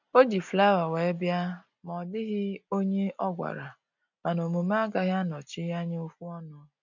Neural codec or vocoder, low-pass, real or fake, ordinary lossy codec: none; 7.2 kHz; real; none